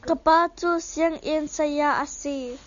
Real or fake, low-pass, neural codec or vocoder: real; 7.2 kHz; none